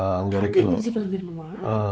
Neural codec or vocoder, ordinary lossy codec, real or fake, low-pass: codec, 16 kHz, 4 kbps, X-Codec, WavLM features, trained on Multilingual LibriSpeech; none; fake; none